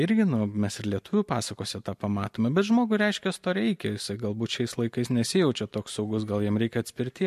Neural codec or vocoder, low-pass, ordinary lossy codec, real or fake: none; 14.4 kHz; MP3, 64 kbps; real